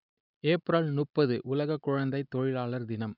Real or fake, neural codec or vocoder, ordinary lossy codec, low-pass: real; none; none; 5.4 kHz